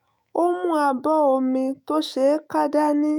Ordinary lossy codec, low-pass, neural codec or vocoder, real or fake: none; none; autoencoder, 48 kHz, 128 numbers a frame, DAC-VAE, trained on Japanese speech; fake